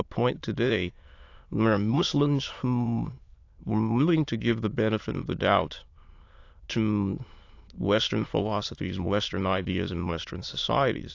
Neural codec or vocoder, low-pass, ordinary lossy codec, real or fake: autoencoder, 22.05 kHz, a latent of 192 numbers a frame, VITS, trained on many speakers; 7.2 kHz; AAC, 48 kbps; fake